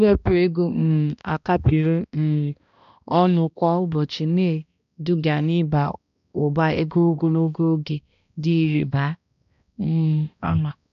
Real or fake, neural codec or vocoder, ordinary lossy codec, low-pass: fake; codec, 16 kHz, 1 kbps, X-Codec, HuBERT features, trained on balanced general audio; none; 7.2 kHz